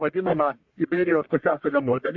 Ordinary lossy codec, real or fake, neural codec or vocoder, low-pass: MP3, 48 kbps; fake; codec, 44.1 kHz, 1.7 kbps, Pupu-Codec; 7.2 kHz